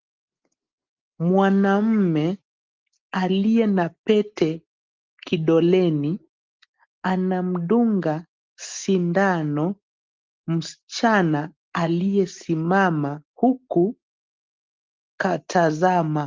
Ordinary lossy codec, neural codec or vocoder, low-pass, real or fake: Opus, 32 kbps; none; 7.2 kHz; real